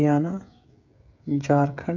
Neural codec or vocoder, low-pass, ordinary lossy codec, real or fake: codec, 16 kHz, 16 kbps, FreqCodec, smaller model; 7.2 kHz; none; fake